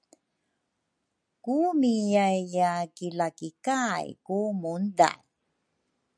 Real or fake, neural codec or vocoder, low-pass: real; none; 9.9 kHz